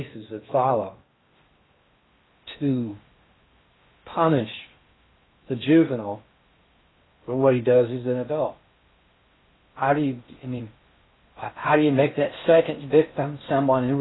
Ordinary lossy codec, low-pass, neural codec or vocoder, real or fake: AAC, 16 kbps; 7.2 kHz; codec, 16 kHz in and 24 kHz out, 0.6 kbps, FocalCodec, streaming, 2048 codes; fake